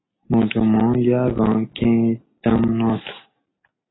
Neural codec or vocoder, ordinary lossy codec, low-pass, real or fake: none; AAC, 16 kbps; 7.2 kHz; real